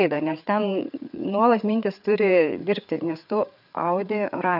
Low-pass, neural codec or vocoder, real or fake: 5.4 kHz; codec, 16 kHz, 4 kbps, FreqCodec, larger model; fake